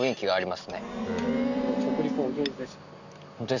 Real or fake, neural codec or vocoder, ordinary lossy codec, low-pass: real; none; none; 7.2 kHz